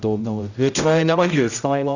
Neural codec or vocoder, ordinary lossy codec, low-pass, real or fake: codec, 16 kHz, 0.5 kbps, X-Codec, HuBERT features, trained on general audio; none; 7.2 kHz; fake